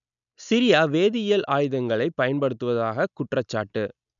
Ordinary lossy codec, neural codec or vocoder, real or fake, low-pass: none; none; real; 7.2 kHz